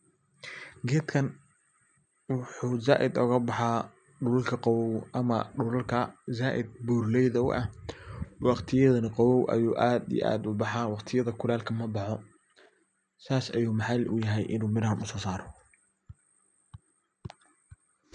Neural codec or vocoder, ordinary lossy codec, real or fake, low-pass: none; none; real; 9.9 kHz